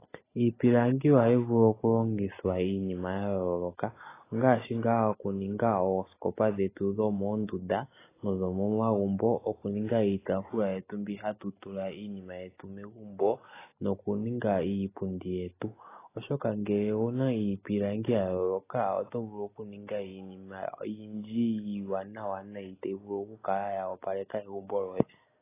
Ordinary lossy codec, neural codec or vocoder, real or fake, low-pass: AAC, 16 kbps; none; real; 3.6 kHz